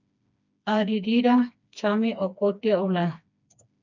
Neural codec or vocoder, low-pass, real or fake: codec, 16 kHz, 2 kbps, FreqCodec, smaller model; 7.2 kHz; fake